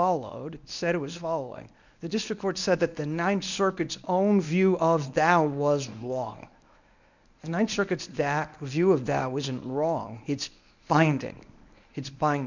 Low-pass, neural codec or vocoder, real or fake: 7.2 kHz; codec, 24 kHz, 0.9 kbps, WavTokenizer, medium speech release version 1; fake